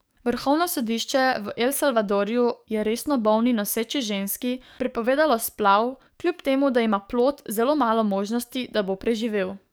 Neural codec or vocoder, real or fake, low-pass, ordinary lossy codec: codec, 44.1 kHz, 7.8 kbps, DAC; fake; none; none